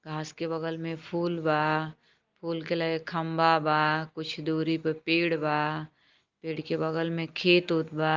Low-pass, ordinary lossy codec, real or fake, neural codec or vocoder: 7.2 kHz; Opus, 32 kbps; real; none